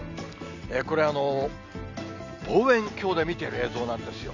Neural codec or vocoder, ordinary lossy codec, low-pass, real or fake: none; none; 7.2 kHz; real